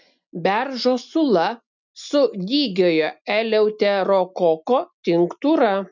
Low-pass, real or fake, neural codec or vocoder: 7.2 kHz; real; none